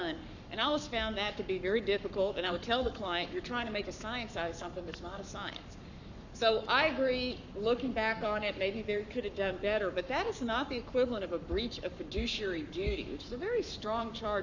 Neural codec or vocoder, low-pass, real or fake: codec, 44.1 kHz, 7.8 kbps, Pupu-Codec; 7.2 kHz; fake